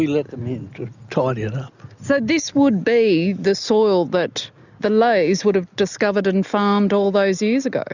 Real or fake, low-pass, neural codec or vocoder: real; 7.2 kHz; none